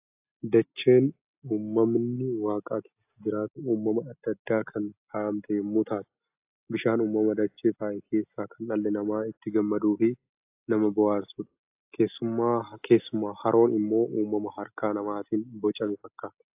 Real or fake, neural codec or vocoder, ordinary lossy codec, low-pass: real; none; AAC, 32 kbps; 3.6 kHz